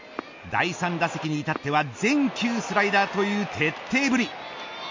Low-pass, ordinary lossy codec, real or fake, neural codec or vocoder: 7.2 kHz; MP3, 48 kbps; real; none